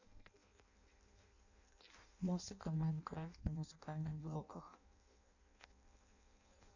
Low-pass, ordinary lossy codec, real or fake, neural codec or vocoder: 7.2 kHz; none; fake; codec, 16 kHz in and 24 kHz out, 0.6 kbps, FireRedTTS-2 codec